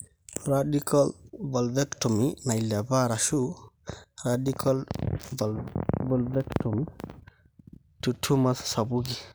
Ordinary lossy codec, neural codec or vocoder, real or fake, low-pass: none; none; real; none